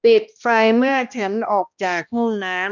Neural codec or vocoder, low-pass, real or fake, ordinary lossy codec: codec, 16 kHz, 1 kbps, X-Codec, HuBERT features, trained on balanced general audio; 7.2 kHz; fake; none